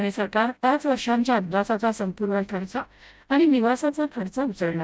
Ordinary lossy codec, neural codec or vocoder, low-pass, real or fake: none; codec, 16 kHz, 0.5 kbps, FreqCodec, smaller model; none; fake